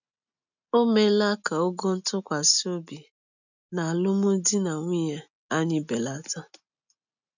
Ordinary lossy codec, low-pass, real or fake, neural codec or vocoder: none; 7.2 kHz; real; none